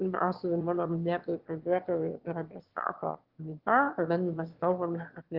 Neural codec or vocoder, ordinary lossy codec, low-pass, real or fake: autoencoder, 22.05 kHz, a latent of 192 numbers a frame, VITS, trained on one speaker; Opus, 16 kbps; 5.4 kHz; fake